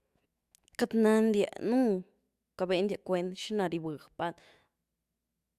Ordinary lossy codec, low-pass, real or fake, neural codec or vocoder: Opus, 64 kbps; 14.4 kHz; fake; autoencoder, 48 kHz, 128 numbers a frame, DAC-VAE, trained on Japanese speech